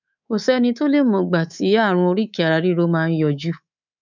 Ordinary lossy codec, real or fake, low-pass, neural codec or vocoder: none; fake; 7.2 kHz; codec, 24 kHz, 3.1 kbps, DualCodec